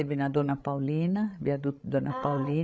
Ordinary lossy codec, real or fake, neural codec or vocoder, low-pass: none; fake; codec, 16 kHz, 16 kbps, FreqCodec, larger model; none